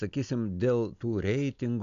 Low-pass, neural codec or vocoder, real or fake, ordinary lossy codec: 7.2 kHz; none; real; AAC, 64 kbps